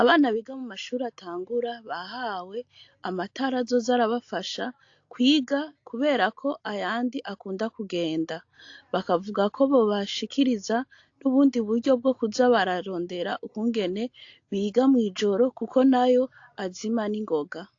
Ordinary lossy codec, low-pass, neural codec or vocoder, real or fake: AAC, 48 kbps; 7.2 kHz; none; real